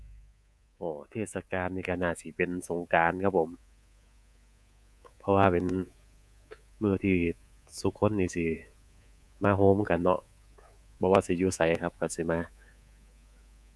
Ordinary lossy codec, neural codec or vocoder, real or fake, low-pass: none; codec, 24 kHz, 3.1 kbps, DualCodec; fake; none